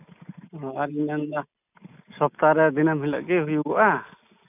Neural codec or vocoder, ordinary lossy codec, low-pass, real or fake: none; none; 3.6 kHz; real